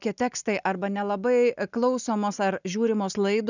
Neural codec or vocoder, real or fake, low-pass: none; real; 7.2 kHz